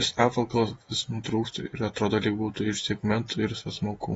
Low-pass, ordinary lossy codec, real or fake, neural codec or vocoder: 19.8 kHz; AAC, 24 kbps; fake; vocoder, 48 kHz, 128 mel bands, Vocos